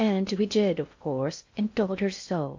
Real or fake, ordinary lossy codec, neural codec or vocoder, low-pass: fake; MP3, 48 kbps; codec, 16 kHz in and 24 kHz out, 0.6 kbps, FocalCodec, streaming, 2048 codes; 7.2 kHz